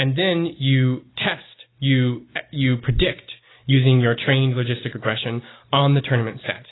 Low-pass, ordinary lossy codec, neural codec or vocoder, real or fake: 7.2 kHz; AAC, 16 kbps; none; real